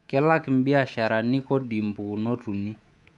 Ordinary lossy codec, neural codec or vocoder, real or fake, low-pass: none; codec, 24 kHz, 3.1 kbps, DualCodec; fake; 10.8 kHz